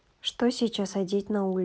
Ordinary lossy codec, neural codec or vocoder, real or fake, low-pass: none; none; real; none